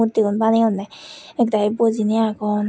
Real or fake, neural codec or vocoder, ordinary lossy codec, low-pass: real; none; none; none